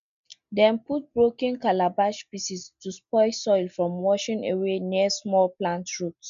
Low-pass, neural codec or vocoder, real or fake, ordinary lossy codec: 7.2 kHz; none; real; MP3, 64 kbps